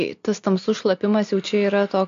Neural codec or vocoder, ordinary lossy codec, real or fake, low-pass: none; AAC, 48 kbps; real; 7.2 kHz